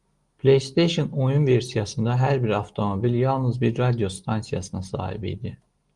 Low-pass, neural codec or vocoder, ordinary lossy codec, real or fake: 10.8 kHz; none; Opus, 24 kbps; real